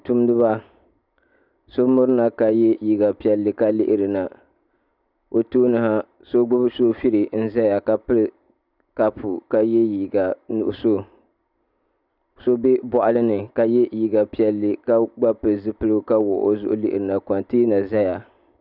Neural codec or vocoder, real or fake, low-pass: none; real; 5.4 kHz